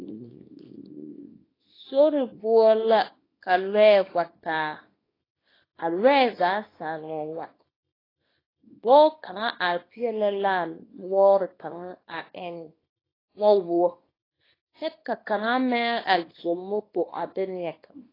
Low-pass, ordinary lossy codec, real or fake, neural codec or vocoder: 5.4 kHz; AAC, 24 kbps; fake; codec, 24 kHz, 0.9 kbps, WavTokenizer, small release